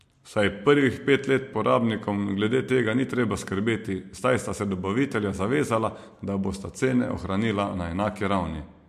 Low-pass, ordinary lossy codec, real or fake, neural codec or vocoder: 14.4 kHz; MP3, 64 kbps; real; none